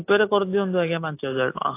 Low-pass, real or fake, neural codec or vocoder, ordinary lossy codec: 3.6 kHz; real; none; AAC, 24 kbps